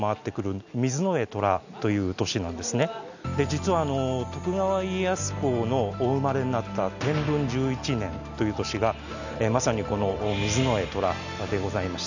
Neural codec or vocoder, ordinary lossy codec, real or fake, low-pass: none; none; real; 7.2 kHz